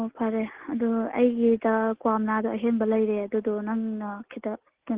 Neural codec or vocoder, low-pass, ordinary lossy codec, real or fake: none; 3.6 kHz; Opus, 16 kbps; real